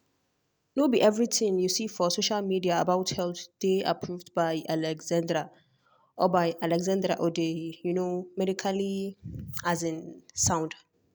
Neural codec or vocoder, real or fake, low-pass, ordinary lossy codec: none; real; none; none